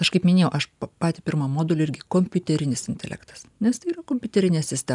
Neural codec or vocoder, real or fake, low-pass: none; real; 10.8 kHz